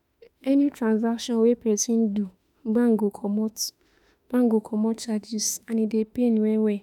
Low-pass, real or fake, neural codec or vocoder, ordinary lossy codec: 19.8 kHz; fake; autoencoder, 48 kHz, 32 numbers a frame, DAC-VAE, trained on Japanese speech; none